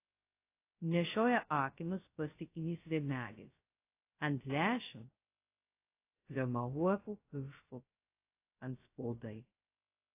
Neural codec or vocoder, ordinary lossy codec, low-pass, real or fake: codec, 16 kHz, 0.2 kbps, FocalCodec; AAC, 24 kbps; 3.6 kHz; fake